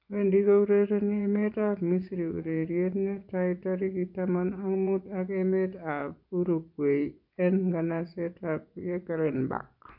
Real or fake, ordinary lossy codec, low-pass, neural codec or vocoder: fake; none; 5.4 kHz; vocoder, 24 kHz, 100 mel bands, Vocos